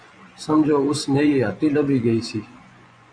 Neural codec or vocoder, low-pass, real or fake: vocoder, 24 kHz, 100 mel bands, Vocos; 9.9 kHz; fake